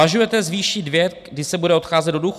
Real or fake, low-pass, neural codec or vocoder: real; 14.4 kHz; none